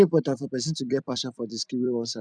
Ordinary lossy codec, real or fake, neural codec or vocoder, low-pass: none; fake; vocoder, 44.1 kHz, 128 mel bands every 256 samples, BigVGAN v2; 9.9 kHz